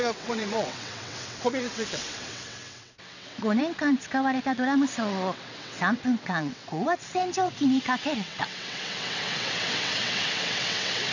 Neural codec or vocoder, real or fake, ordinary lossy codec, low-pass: vocoder, 22.05 kHz, 80 mel bands, WaveNeXt; fake; none; 7.2 kHz